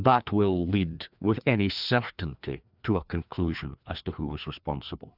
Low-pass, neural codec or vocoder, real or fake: 5.4 kHz; codec, 16 kHz, 2 kbps, FreqCodec, larger model; fake